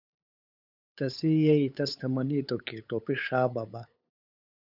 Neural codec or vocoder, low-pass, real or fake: codec, 16 kHz, 8 kbps, FunCodec, trained on LibriTTS, 25 frames a second; 5.4 kHz; fake